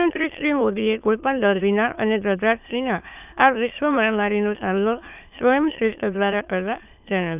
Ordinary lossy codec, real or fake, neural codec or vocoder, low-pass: none; fake; autoencoder, 22.05 kHz, a latent of 192 numbers a frame, VITS, trained on many speakers; 3.6 kHz